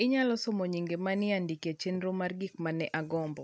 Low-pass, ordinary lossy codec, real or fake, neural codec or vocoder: none; none; real; none